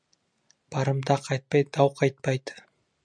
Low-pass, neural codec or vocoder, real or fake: 9.9 kHz; none; real